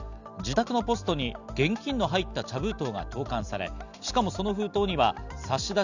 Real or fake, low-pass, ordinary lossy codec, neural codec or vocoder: real; 7.2 kHz; none; none